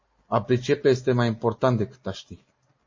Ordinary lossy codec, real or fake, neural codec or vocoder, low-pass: MP3, 32 kbps; real; none; 7.2 kHz